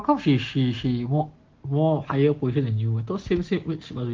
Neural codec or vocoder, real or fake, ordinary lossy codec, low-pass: none; real; Opus, 16 kbps; 7.2 kHz